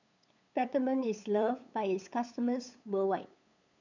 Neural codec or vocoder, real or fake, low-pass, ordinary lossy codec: codec, 16 kHz, 8 kbps, FunCodec, trained on LibriTTS, 25 frames a second; fake; 7.2 kHz; none